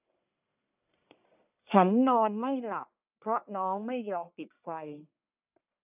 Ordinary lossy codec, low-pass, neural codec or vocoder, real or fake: none; 3.6 kHz; codec, 44.1 kHz, 1.7 kbps, Pupu-Codec; fake